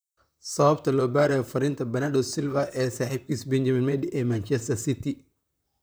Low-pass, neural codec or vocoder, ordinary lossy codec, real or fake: none; vocoder, 44.1 kHz, 128 mel bands, Pupu-Vocoder; none; fake